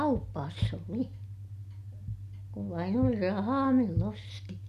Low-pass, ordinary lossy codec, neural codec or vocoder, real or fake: 14.4 kHz; none; none; real